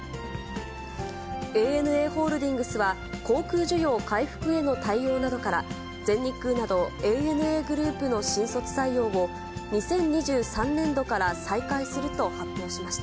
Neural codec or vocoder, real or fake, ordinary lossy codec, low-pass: none; real; none; none